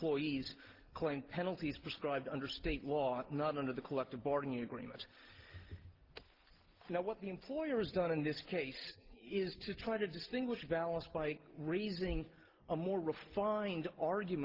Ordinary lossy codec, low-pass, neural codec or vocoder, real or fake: Opus, 16 kbps; 5.4 kHz; none; real